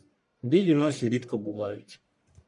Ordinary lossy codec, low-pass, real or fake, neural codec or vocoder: MP3, 96 kbps; 10.8 kHz; fake; codec, 44.1 kHz, 1.7 kbps, Pupu-Codec